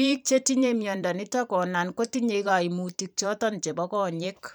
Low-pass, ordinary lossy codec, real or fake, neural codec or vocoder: none; none; fake; vocoder, 44.1 kHz, 128 mel bands every 512 samples, BigVGAN v2